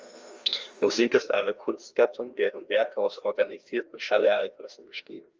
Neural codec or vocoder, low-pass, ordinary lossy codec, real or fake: codec, 16 kHz, 1 kbps, FreqCodec, larger model; 7.2 kHz; Opus, 32 kbps; fake